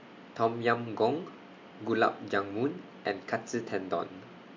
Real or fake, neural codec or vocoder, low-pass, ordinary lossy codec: real; none; 7.2 kHz; MP3, 48 kbps